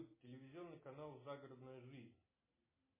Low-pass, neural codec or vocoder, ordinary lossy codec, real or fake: 3.6 kHz; none; MP3, 16 kbps; real